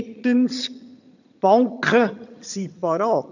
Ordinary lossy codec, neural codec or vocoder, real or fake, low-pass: none; vocoder, 22.05 kHz, 80 mel bands, HiFi-GAN; fake; 7.2 kHz